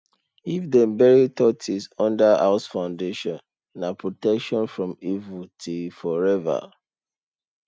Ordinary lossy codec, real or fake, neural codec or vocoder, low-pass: none; real; none; none